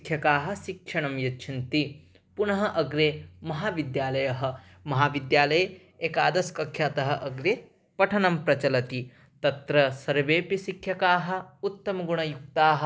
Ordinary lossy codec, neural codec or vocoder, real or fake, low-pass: none; none; real; none